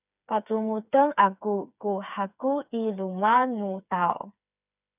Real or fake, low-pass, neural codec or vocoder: fake; 3.6 kHz; codec, 16 kHz, 4 kbps, FreqCodec, smaller model